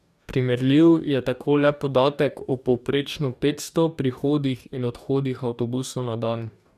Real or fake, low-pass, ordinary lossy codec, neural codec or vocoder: fake; 14.4 kHz; none; codec, 44.1 kHz, 2.6 kbps, DAC